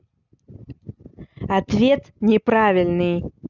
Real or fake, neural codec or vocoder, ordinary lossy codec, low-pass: real; none; none; 7.2 kHz